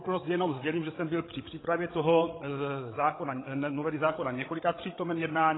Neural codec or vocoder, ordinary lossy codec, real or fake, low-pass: codec, 16 kHz, 8 kbps, FreqCodec, larger model; AAC, 16 kbps; fake; 7.2 kHz